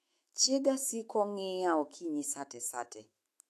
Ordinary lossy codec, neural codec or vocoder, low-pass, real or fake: AAC, 64 kbps; autoencoder, 48 kHz, 128 numbers a frame, DAC-VAE, trained on Japanese speech; 14.4 kHz; fake